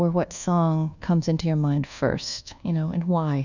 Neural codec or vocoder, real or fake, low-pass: codec, 24 kHz, 1.2 kbps, DualCodec; fake; 7.2 kHz